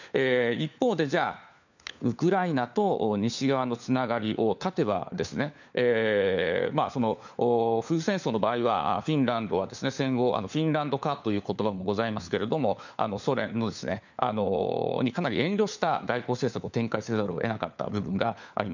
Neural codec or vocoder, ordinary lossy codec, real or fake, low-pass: codec, 16 kHz, 4 kbps, FunCodec, trained on LibriTTS, 50 frames a second; none; fake; 7.2 kHz